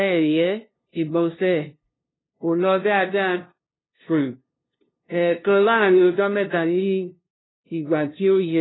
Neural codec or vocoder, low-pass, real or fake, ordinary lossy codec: codec, 16 kHz, 0.5 kbps, FunCodec, trained on LibriTTS, 25 frames a second; 7.2 kHz; fake; AAC, 16 kbps